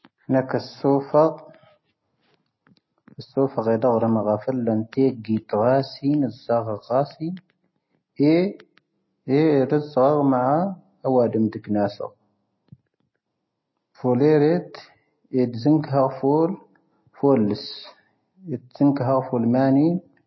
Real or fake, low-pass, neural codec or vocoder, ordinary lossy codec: real; 7.2 kHz; none; MP3, 24 kbps